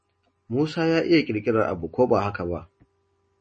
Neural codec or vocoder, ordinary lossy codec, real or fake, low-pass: none; MP3, 32 kbps; real; 10.8 kHz